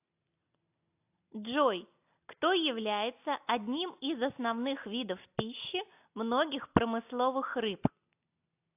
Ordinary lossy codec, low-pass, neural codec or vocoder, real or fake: AAC, 32 kbps; 3.6 kHz; none; real